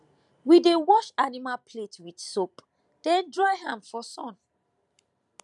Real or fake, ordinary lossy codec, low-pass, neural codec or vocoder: fake; none; 10.8 kHz; vocoder, 44.1 kHz, 128 mel bands every 512 samples, BigVGAN v2